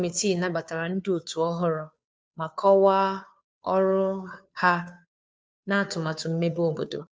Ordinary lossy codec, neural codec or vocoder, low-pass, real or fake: none; codec, 16 kHz, 2 kbps, FunCodec, trained on Chinese and English, 25 frames a second; none; fake